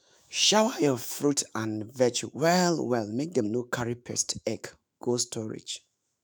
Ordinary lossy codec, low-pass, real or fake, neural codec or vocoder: none; none; fake; autoencoder, 48 kHz, 128 numbers a frame, DAC-VAE, trained on Japanese speech